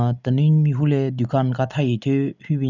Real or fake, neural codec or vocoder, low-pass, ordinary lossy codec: real; none; 7.2 kHz; none